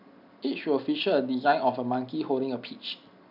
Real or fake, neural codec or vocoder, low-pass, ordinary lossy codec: real; none; 5.4 kHz; none